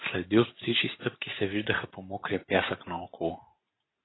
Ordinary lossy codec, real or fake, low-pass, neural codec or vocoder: AAC, 16 kbps; real; 7.2 kHz; none